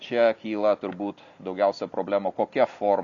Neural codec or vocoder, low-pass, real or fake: none; 7.2 kHz; real